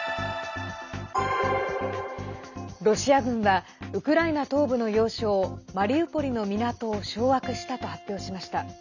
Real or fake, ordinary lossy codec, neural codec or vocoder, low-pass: real; none; none; 7.2 kHz